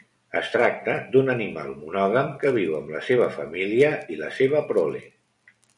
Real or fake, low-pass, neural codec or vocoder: fake; 10.8 kHz; vocoder, 44.1 kHz, 128 mel bands every 256 samples, BigVGAN v2